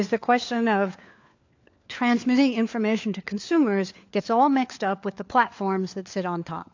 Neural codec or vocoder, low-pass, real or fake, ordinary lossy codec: codec, 16 kHz, 4 kbps, FunCodec, trained on LibriTTS, 50 frames a second; 7.2 kHz; fake; AAC, 48 kbps